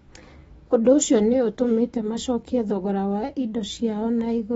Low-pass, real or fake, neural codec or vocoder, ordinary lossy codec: 19.8 kHz; fake; autoencoder, 48 kHz, 128 numbers a frame, DAC-VAE, trained on Japanese speech; AAC, 24 kbps